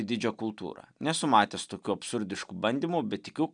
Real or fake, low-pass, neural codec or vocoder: real; 9.9 kHz; none